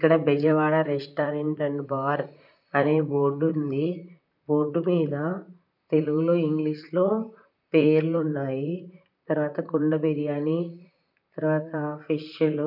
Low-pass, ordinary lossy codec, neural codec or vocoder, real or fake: 5.4 kHz; none; vocoder, 44.1 kHz, 128 mel bands, Pupu-Vocoder; fake